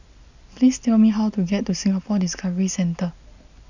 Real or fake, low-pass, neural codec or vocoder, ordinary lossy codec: real; 7.2 kHz; none; none